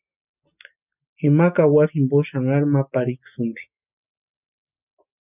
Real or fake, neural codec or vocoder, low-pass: real; none; 3.6 kHz